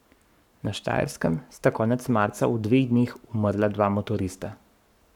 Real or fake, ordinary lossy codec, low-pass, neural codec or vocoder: fake; none; 19.8 kHz; codec, 44.1 kHz, 7.8 kbps, Pupu-Codec